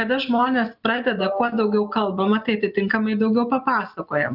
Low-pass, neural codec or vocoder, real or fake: 5.4 kHz; none; real